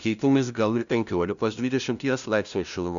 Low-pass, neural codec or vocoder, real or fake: 7.2 kHz; codec, 16 kHz, 0.5 kbps, FunCodec, trained on LibriTTS, 25 frames a second; fake